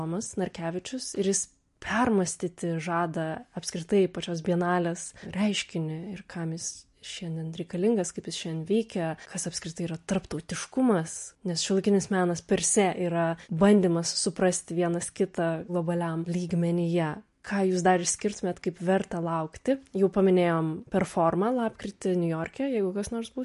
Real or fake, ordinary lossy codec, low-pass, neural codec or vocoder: real; MP3, 48 kbps; 14.4 kHz; none